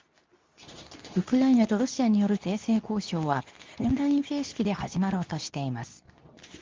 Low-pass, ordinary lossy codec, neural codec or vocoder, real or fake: 7.2 kHz; Opus, 32 kbps; codec, 24 kHz, 0.9 kbps, WavTokenizer, medium speech release version 2; fake